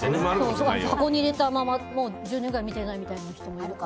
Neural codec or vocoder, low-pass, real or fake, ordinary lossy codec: none; none; real; none